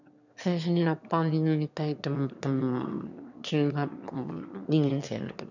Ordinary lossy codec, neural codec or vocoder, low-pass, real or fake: none; autoencoder, 22.05 kHz, a latent of 192 numbers a frame, VITS, trained on one speaker; 7.2 kHz; fake